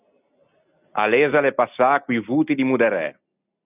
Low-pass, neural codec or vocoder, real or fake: 3.6 kHz; none; real